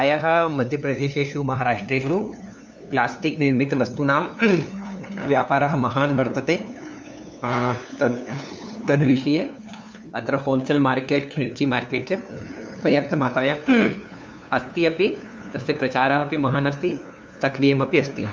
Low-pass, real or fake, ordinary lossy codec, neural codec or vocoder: none; fake; none; codec, 16 kHz, 2 kbps, FunCodec, trained on LibriTTS, 25 frames a second